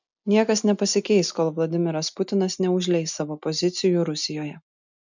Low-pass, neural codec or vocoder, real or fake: 7.2 kHz; none; real